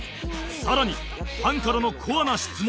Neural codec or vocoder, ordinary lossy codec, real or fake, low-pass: none; none; real; none